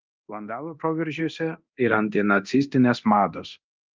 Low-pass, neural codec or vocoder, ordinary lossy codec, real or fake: 7.2 kHz; codec, 24 kHz, 0.9 kbps, DualCodec; Opus, 32 kbps; fake